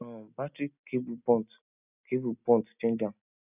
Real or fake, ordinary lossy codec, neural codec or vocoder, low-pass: real; none; none; 3.6 kHz